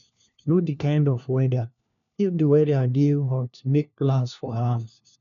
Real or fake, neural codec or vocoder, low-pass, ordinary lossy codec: fake; codec, 16 kHz, 1 kbps, FunCodec, trained on LibriTTS, 50 frames a second; 7.2 kHz; none